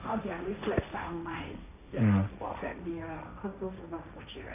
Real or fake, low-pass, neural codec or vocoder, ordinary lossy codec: fake; 3.6 kHz; codec, 16 kHz, 1.1 kbps, Voila-Tokenizer; none